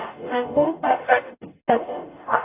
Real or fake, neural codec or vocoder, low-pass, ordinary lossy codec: fake; codec, 44.1 kHz, 0.9 kbps, DAC; 3.6 kHz; AAC, 24 kbps